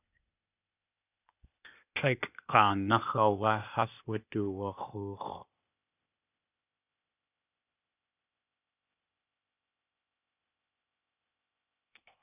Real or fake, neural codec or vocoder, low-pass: fake; codec, 16 kHz, 0.8 kbps, ZipCodec; 3.6 kHz